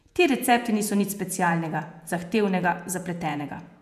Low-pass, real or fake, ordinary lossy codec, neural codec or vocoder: 14.4 kHz; fake; none; vocoder, 48 kHz, 128 mel bands, Vocos